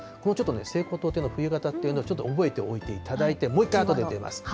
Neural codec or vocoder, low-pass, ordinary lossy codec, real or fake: none; none; none; real